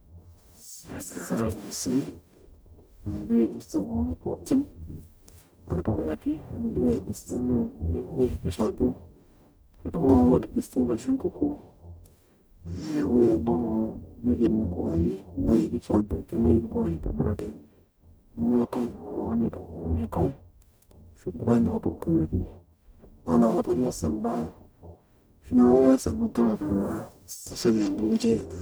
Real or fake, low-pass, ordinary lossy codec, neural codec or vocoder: fake; none; none; codec, 44.1 kHz, 0.9 kbps, DAC